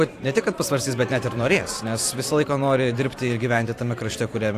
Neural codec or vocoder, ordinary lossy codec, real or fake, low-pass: vocoder, 44.1 kHz, 128 mel bands every 512 samples, BigVGAN v2; AAC, 64 kbps; fake; 14.4 kHz